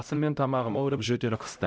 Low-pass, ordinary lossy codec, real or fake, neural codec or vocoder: none; none; fake; codec, 16 kHz, 0.5 kbps, X-Codec, HuBERT features, trained on LibriSpeech